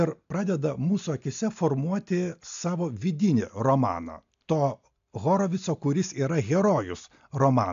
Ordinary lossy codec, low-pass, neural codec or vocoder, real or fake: AAC, 64 kbps; 7.2 kHz; none; real